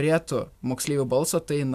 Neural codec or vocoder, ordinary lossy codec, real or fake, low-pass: none; MP3, 96 kbps; real; 14.4 kHz